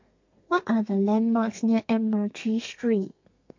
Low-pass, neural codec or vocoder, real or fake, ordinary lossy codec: 7.2 kHz; codec, 32 kHz, 1.9 kbps, SNAC; fake; MP3, 48 kbps